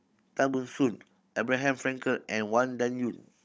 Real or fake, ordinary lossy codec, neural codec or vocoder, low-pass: fake; none; codec, 16 kHz, 16 kbps, FunCodec, trained on Chinese and English, 50 frames a second; none